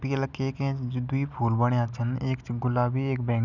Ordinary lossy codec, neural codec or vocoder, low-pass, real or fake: none; none; 7.2 kHz; real